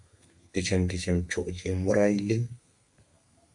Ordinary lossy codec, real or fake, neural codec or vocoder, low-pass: MP3, 64 kbps; fake; codec, 32 kHz, 1.9 kbps, SNAC; 10.8 kHz